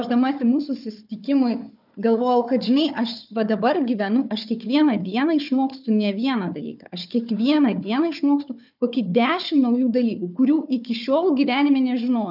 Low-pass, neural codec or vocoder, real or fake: 5.4 kHz; codec, 16 kHz, 4 kbps, FunCodec, trained on Chinese and English, 50 frames a second; fake